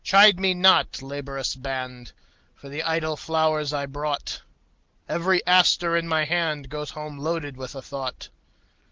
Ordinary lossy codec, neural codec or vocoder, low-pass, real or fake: Opus, 16 kbps; none; 7.2 kHz; real